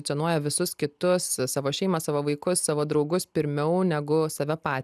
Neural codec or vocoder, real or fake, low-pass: none; real; 14.4 kHz